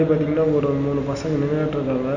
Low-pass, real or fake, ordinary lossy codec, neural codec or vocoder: 7.2 kHz; real; none; none